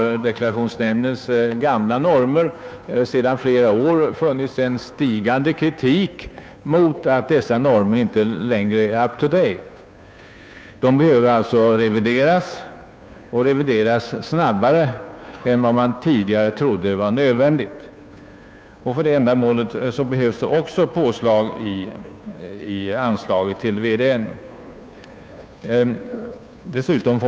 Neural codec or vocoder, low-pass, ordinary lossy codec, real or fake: codec, 16 kHz, 2 kbps, FunCodec, trained on Chinese and English, 25 frames a second; none; none; fake